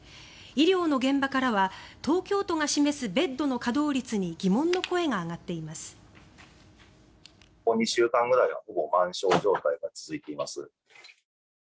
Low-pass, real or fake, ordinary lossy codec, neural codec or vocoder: none; real; none; none